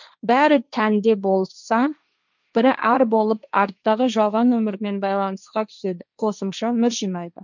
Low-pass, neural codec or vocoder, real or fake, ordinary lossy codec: 7.2 kHz; codec, 16 kHz, 1.1 kbps, Voila-Tokenizer; fake; none